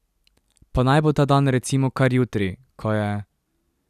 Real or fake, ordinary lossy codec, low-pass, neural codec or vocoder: real; none; 14.4 kHz; none